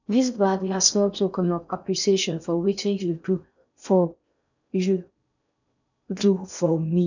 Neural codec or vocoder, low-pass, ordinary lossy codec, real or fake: codec, 16 kHz in and 24 kHz out, 0.6 kbps, FocalCodec, streaming, 4096 codes; 7.2 kHz; none; fake